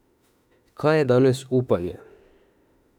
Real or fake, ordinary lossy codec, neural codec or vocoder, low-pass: fake; none; autoencoder, 48 kHz, 32 numbers a frame, DAC-VAE, trained on Japanese speech; 19.8 kHz